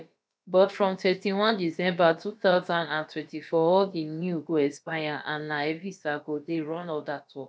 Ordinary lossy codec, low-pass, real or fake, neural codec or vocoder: none; none; fake; codec, 16 kHz, about 1 kbps, DyCAST, with the encoder's durations